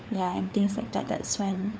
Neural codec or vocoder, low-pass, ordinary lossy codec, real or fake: codec, 16 kHz, 4 kbps, FunCodec, trained on LibriTTS, 50 frames a second; none; none; fake